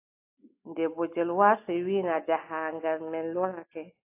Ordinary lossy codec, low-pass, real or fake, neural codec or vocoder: AAC, 24 kbps; 3.6 kHz; real; none